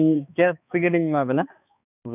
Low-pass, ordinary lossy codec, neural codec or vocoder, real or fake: 3.6 kHz; none; codec, 16 kHz, 2 kbps, X-Codec, HuBERT features, trained on balanced general audio; fake